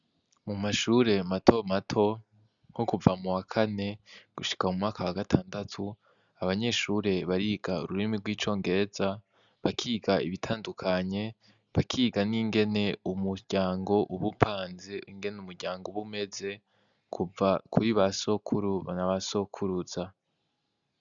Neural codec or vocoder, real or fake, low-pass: none; real; 7.2 kHz